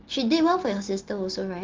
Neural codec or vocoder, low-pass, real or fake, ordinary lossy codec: none; 7.2 kHz; real; Opus, 16 kbps